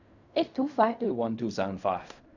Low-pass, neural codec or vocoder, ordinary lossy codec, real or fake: 7.2 kHz; codec, 16 kHz in and 24 kHz out, 0.4 kbps, LongCat-Audio-Codec, fine tuned four codebook decoder; none; fake